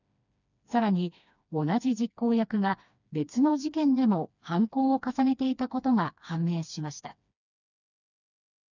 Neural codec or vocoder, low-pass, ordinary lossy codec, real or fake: codec, 16 kHz, 2 kbps, FreqCodec, smaller model; 7.2 kHz; none; fake